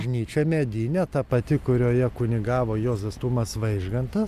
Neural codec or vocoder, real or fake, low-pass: none; real; 14.4 kHz